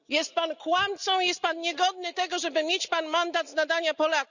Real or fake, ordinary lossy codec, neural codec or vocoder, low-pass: real; none; none; 7.2 kHz